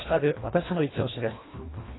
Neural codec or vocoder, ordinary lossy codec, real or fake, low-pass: codec, 24 kHz, 1.5 kbps, HILCodec; AAC, 16 kbps; fake; 7.2 kHz